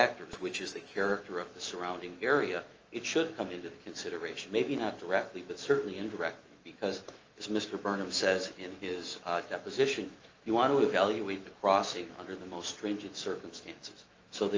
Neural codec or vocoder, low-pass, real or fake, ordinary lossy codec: autoencoder, 48 kHz, 128 numbers a frame, DAC-VAE, trained on Japanese speech; 7.2 kHz; fake; Opus, 24 kbps